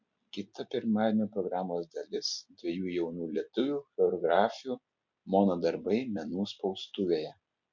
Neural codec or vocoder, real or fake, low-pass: none; real; 7.2 kHz